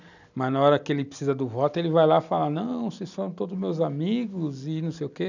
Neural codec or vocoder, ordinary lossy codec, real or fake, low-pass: none; none; real; 7.2 kHz